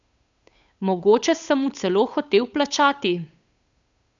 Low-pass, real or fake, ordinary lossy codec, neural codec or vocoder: 7.2 kHz; fake; none; codec, 16 kHz, 8 kbps, FunCodec, trained on Chinese and English, 25 frames a second